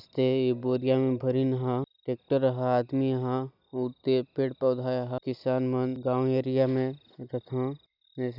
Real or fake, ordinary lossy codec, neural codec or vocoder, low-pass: real; none; none; 5.4 kHz